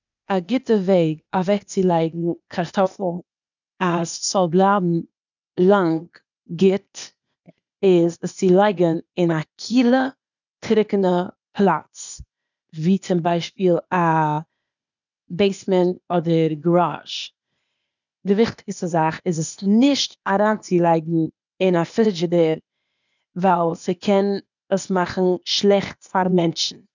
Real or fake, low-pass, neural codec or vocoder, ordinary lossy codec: fake; 7.2 kHz; codec, 16 kHz, 0.8 kbps, ZipCodec; none